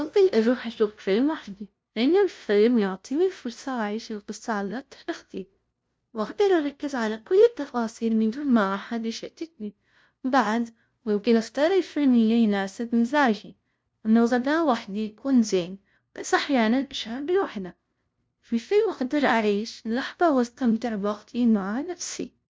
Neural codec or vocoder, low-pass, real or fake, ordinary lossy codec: codec, 16 kHz, 0.5 kbps, FunCodec, trained on LibriTTS, 25 frames a second; none; fake; none